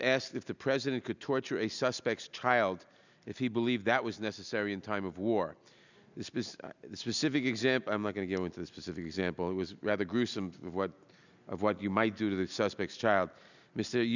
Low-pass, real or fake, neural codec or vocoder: 7.2 kHz; real; none